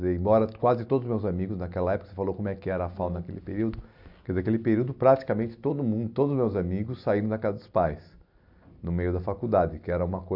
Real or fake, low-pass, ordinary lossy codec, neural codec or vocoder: real; 5.4 kHz; AAC, 48 kbps; none